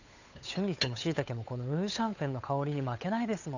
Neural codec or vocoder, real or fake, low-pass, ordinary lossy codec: codec, 16 kHz, 8 kbps, FunCodec, trained on Chinese and English, 25 frames a second; fake; 7.2 kHz; none